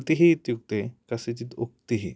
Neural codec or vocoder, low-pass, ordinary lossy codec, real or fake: none; none; none; real